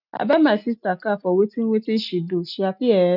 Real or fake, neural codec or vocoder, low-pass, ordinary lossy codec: real; none; 5.4 kHz; none